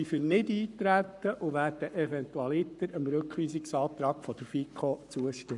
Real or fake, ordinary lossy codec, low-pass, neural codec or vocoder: fake; none; 10.8 kHz; codec, 44.1 kHz, 7.8 kbps, Pupu-Codec